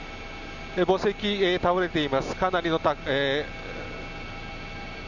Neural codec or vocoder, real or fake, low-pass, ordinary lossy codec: none; real; 7.2 kHz; none